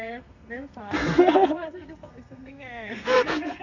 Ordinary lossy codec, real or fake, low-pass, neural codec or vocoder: none; fake; 7.2 kHz; codec, 32 kHz, 1.9 kbps, SNAC